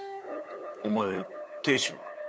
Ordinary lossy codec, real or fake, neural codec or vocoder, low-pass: none; fake; codec, 16 kHz, 8 kbps, FunCodec, trained on LibriTTS, 25 frames a second; none